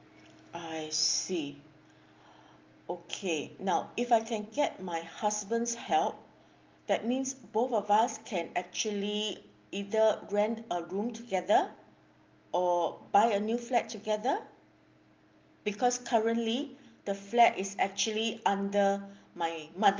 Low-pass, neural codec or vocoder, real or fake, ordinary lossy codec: 7.2 kHz; none; real; Opus, 32 kbps